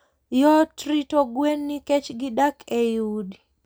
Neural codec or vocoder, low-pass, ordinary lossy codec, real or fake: none; none; none; real